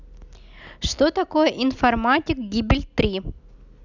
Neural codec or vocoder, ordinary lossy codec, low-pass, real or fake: none; none; 7.2 kHz; real